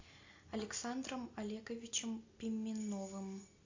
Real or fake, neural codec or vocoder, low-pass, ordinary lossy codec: real; none; 7.2 kHz; AAC, 48 kbps